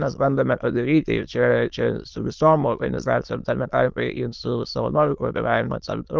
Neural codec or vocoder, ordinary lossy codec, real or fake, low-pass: autoencoder, 22.05 kHz, a latent of 192 numbers a frame, VITS, trained on many speakers; Opus, 32 kbps; fake; 7.2 kHz